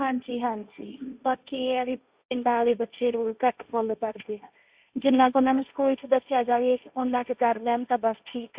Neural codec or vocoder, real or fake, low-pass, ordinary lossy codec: codec, 16 kHz, 1.1 kbps, Voila-Tokenizer; fake; 3.6 kHz; Opus, 64 kbps